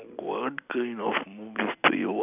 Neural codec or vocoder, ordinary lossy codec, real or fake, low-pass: none; none; real; 3.6 kHz